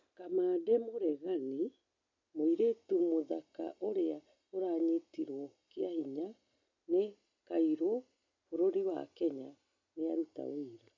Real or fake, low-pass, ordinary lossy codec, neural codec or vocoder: real; 7.2 kHz; MP3, 64 kbps; none